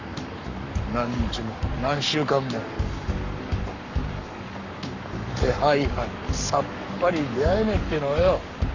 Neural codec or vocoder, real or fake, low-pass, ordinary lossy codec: codec, 44.1 kHz, 7.8 kbps, Pupu-Codec; fake; 7.2 kHz; none